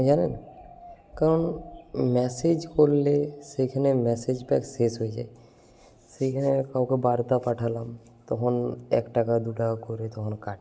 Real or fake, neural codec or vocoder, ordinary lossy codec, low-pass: real; none; none; none